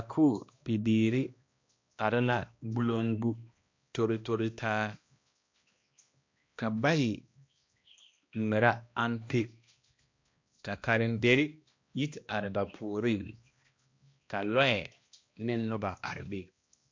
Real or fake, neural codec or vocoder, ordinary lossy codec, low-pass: fake; codec, 16 kHz, 1 kbps, X-Codec, HuBERT features, trained on balanced general audio; MP3, 48 kbps; 7.2 kHz